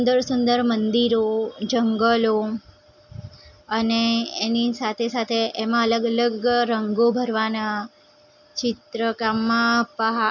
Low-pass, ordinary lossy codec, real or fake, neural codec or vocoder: 7.2 kHz; none; real; none